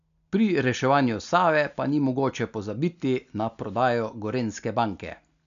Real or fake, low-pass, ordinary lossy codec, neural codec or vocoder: real; 7.2 kHz; none; none